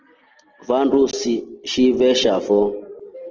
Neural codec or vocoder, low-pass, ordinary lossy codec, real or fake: none; 7.2 kHz; Opus, 16 kbps; real